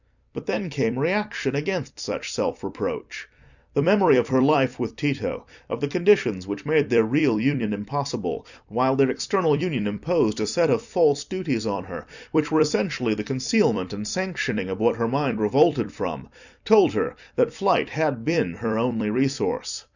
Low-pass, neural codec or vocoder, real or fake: 7.2 kHz; vocoder, 44.1 kHz, 128 mel bands every 256 samples, BigVGAN v2; fake